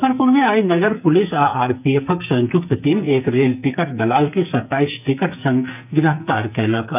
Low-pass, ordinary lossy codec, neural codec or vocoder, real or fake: 3.6 kHz; none; codec, 44.1 kHz, 2.6 kbps, SNAC; fake